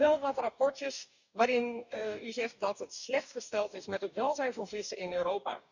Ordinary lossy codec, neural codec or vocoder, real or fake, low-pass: none; codec, 44.1 kHz, 2.6 kbps, DAC; fake; 7.2 kHz